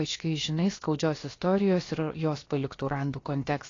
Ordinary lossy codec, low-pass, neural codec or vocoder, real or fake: AAC, 32 kbps; 7.2 kHz; codec, 16 kHz, about 1 kbps, DyCAST, with the encoder's durations; fake